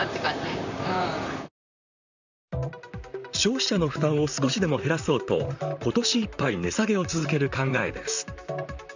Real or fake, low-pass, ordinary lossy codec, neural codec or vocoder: fake; 7.2 kHz; none; vocoder, 44.1 kHz, 128 mel bands, Pupu-Vocoder